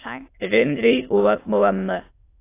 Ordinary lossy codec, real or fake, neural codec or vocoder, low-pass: AAC, 24 kbps; fake; autoencoder, 22.05 kHz, a latent of 192 numbers a frame, VITS, trained on many speakers; 3.6 kHz